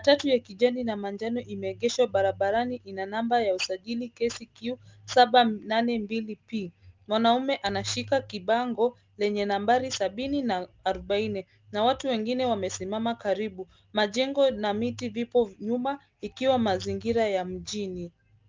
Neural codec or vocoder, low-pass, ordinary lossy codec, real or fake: none; 7.2 kHz; Opus, 24 kbps; real